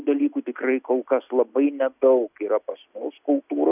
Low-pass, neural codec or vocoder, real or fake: 3.6 kHz; none; real